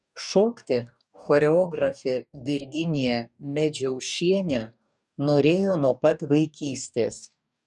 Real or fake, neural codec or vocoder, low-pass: fake; codec, 44.1 kHz, 2.6 kbps, DAC; 10.8 kHz